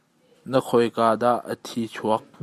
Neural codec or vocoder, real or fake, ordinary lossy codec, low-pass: none; real; Opus, 64 kbps; 14.4 kHz